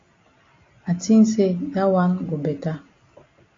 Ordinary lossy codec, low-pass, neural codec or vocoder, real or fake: AAC, 32 kbps; 7.2 kHz; none; real